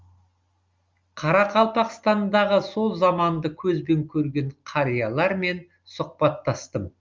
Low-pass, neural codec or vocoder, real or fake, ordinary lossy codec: 7.2 kHz; none; real; Opus, 32 kbps